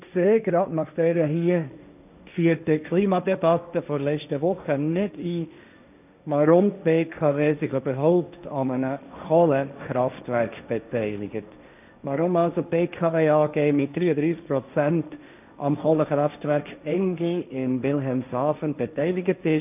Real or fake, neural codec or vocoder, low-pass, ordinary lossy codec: fake; codec, 16 kHz, 1.1 kbps, Voila-Tokenizer; 3.6 kHz; none